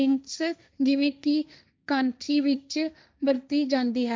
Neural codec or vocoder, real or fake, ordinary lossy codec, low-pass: codec, 16 kHz, 1.1 kbps, Voila-Tokenizer; fake; none; none